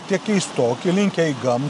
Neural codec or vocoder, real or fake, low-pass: none; real; 10.8 kHz